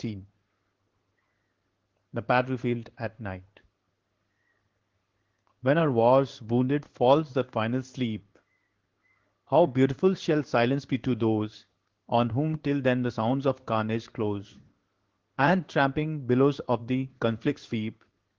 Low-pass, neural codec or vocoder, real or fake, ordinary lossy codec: 7.2 kHz; codec, 16 kHz in and 24 kHz out, 1 kbps, XY-Tokenizer; fake; Opus, 16 kbps